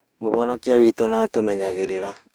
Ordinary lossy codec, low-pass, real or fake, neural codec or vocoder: none; none; fake; codec, 44.1 kHz, 2.6 kbps, DAC